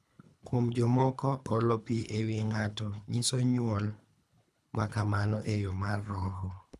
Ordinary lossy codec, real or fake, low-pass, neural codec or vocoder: none; fake; none; codec, 24 kHz, 3 kbps, HILCodec